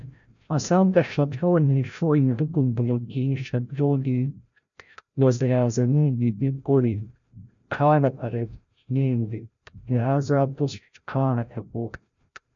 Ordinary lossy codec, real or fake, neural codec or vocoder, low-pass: MP3, 96 kbps; fake; codec, 16 kHz, 0.5 kbps, FreqCodec, larger model; 7.2 kHz